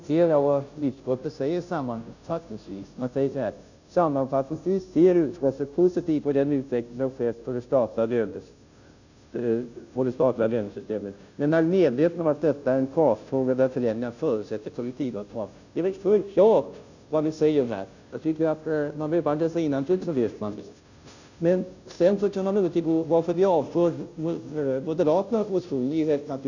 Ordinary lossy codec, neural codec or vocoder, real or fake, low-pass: none; codec, 16 kHz, 0.5 kbps, FunCodec, trained on Chinese and English, 25 frames a second; fake; 7.2 kHz